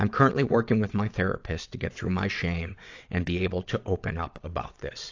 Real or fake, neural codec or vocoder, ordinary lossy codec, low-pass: fake; vocoder, 22.05 kHz, 80 mel bands, WaveNeXt; AAC, 48 kbps; 7.2 kHz